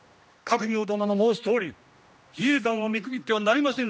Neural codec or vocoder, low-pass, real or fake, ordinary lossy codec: codec, 16 kHz, 1 kbps, X-Codec, HuBERT features, trained on general audio; none; fake; none